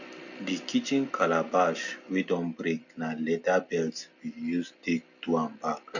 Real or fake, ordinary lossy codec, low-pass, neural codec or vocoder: real; none; 7.2 kHz; none